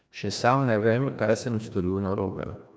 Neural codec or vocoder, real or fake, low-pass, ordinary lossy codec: codec, 16 kHz, 1 kbps, FreqCodec, larger model; fake; none; none